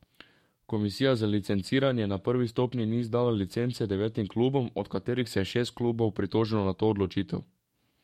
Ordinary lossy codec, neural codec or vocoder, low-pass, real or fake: MP3, 64 kbps; codec, 44.1 kHz, 7.8 kbps, DAC; 19.8 kHz; fake